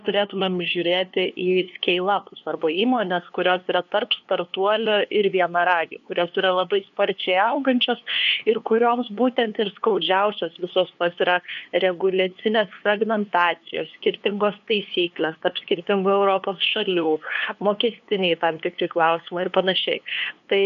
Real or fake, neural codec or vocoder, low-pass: fake; codec, 16 kHz, 2 kbps, FunCodec, trained on LibriTTS, 25 frames a second; 7.2 kHz